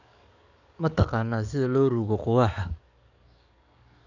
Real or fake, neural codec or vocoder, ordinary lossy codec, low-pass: fake; codec, 44.1 kHz, 7.8 kbps, DAC; none; 7.2 kHz